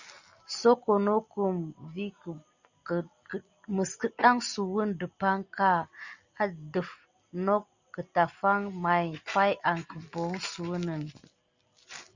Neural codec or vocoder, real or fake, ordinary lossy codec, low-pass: none; real; Opus, 64 kbps; 7.2 kHz